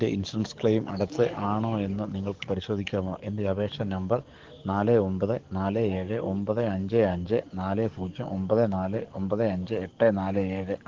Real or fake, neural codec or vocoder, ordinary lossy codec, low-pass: fake; codec, 44.1 kHz, 7.8 kbps, DAC; Opus, 16 kbps; 7.2 kHz